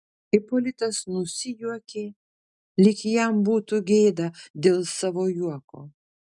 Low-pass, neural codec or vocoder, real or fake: 10.8 kHz; none; real